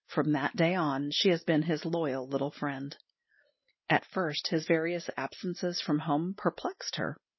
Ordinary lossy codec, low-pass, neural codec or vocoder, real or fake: MP3, 24 kbps; 7.2 kHz; none; real